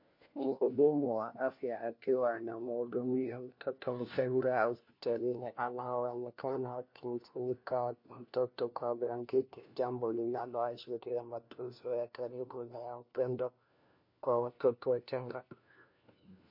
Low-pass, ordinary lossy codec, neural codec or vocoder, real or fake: 5.4 kHz; MP3, 32 kbps; codec, 16 kHz, 1 kbps, FunCodec, trained on LibriTTS, 50 frames a second; fake